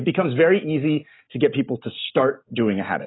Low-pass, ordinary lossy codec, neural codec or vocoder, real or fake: 7.2 kHz; AAC, 16 kbps; none; real